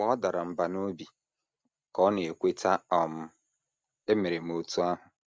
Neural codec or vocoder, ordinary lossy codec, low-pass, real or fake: none; none; none; real